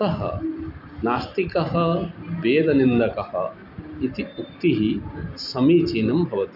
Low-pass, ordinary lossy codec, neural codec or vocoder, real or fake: 5.4 kHz; none; none; real